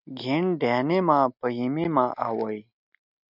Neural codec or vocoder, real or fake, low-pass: none; real; 5.4 kHz